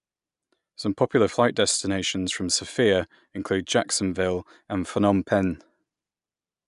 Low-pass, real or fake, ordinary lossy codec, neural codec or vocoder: 10.8 kHz; real; none; none